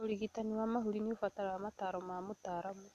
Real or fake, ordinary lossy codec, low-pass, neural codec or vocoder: real; none; 14.4 kHz; none